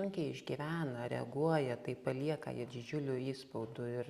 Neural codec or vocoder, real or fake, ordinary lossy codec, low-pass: none; real; Opus, 64 kbps; 14.4 kHz